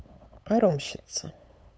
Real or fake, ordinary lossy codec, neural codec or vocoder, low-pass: fake; none; codec, 16 kHz, 8 kbps, FunCodec, trained on LibriTTS, 25 frames a second; none